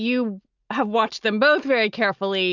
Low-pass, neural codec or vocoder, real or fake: 7.2 kHz; none; real